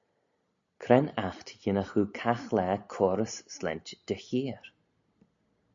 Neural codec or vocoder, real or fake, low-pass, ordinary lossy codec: none; real; 7.2 kHz; AAC, 64 kbps